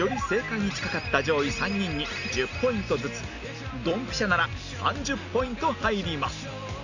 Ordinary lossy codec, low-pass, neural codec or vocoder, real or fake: none; 7.2 kHz; none; real